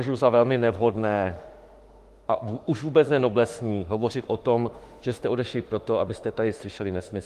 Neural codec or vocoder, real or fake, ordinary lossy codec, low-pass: autoencoder, 48 kHz, 32 numbers a frame, DAC-VAE, trained on Japanese speech; fake; Opus, 24 kbps; 14.4 kHz